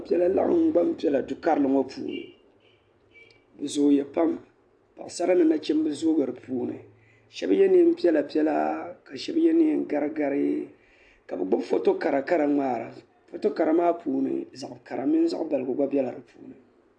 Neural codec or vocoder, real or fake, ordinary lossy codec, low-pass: none; real; AAC, 64 kbps; 9.9 kHz